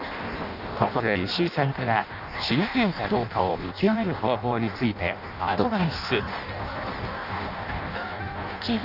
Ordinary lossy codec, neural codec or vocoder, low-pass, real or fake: none; codec, 16 kHz in and 24 kHz out, 0.6 kbps, FireRedTTS-2 codec; 5.4 kHz; fake